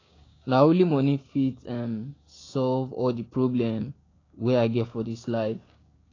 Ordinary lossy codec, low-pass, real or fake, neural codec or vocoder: AAC, 32 kbps; 7.2 kHz; fake; codec, 16 kHz, 6 kbps, DAC